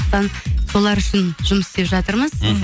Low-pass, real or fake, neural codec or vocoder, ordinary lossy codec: none; real; none; none